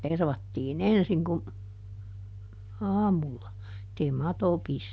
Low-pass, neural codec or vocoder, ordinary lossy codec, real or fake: none; none; none; real